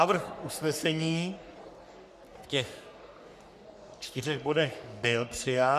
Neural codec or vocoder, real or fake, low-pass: codec, 44.1 kHz, 3.4 kbps, Pupu-Codec; fake; 14.4 kHz